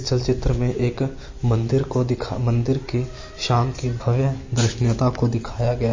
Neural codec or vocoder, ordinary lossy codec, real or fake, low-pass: none; MP3, 32 kbps; real; 7.2 kHz